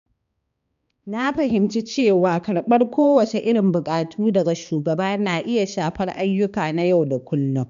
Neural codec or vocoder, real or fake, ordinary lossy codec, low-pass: codec, 16 kHz, 2 kbps, X-Codec, HuBERT features, trained on balanced general audio; fake; none; 7.2 kHz